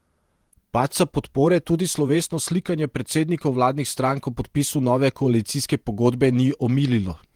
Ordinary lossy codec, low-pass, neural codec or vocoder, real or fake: Opus, 24 kbps; 19.8 kHz; vocoder, 48 kHz, 128 mel bands, Vocos; fake